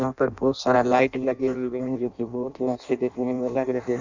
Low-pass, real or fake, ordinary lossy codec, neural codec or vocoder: 7.2 kHz; fake; none; codec, 16 kHz in and 24 kHz out, 0.6 kbps, FireRedTTS-2 codec